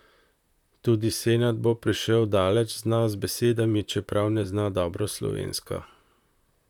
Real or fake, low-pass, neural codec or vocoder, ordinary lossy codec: fake; 19.8 kHz; vocoder, 44.1 kHz, 128 mel bands, Pupu-Vocoder; none